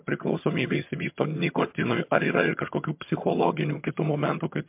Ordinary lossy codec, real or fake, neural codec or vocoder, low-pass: MP3, 32 kbps; fake; vocoder, 22.05 kHz, 80 mel bands, HiFi-GAN; 3.6 kHz